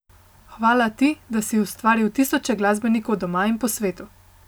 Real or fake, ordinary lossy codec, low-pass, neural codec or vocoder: real; none; none; none